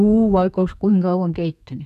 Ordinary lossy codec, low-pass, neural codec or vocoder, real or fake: none; 14.4 kHz; codec, 32 kHz, 1.9 kbps, SNAC; fake